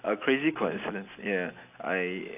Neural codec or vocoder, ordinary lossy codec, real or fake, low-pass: none; AAC, 32 kbps; real; 3.6 kHz